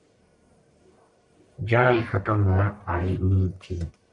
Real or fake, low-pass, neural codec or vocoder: fake; 10.8 kHz; codec, 44.1 kHz, 1.7 kbps, Pupu-Codec